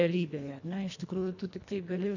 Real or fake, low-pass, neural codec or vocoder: fake; 7.2 kHz; codec, 24 kHz, 1.5 kbps, HILCodec